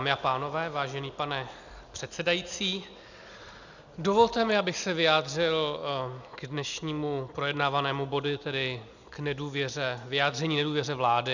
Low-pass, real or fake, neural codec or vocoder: 7.2 kHz; real; none